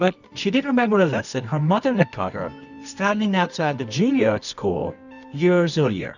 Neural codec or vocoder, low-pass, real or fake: codec, 24 kHz, 0.9 kbps, WavTokenizer, medium music audio release; 7.2 kHz; fake